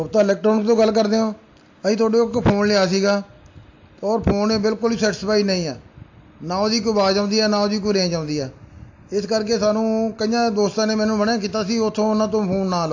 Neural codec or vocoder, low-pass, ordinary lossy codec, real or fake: none; 7.2 kHz; AAC, 48 kbps; real